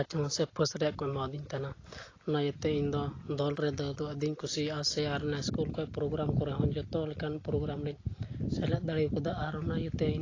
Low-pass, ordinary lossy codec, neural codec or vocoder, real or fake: 7.2 kHz; AAC, 32 kbps; none; real